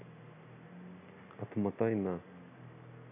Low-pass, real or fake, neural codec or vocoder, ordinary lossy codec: 3.6 kHz; real; none; none